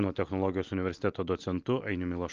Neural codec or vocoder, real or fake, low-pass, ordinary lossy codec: none; real; 7.2 kHz; Opus, 24 kbps